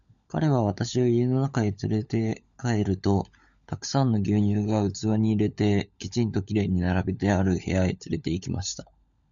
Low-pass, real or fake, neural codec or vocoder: 7.2 kHz; fake; codec, 16 kHz, 16 kbps, FunCodec, trained on LibriTTS, 50 frames a second